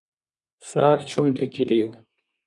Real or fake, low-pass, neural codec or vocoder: fake; 10.8 kHz; codec, 24 kHz, 1 kbps, SNAC